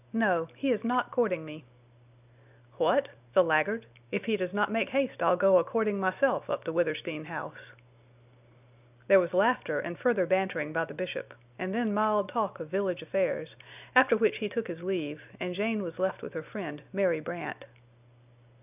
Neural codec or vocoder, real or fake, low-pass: none; real; 3.6 kHz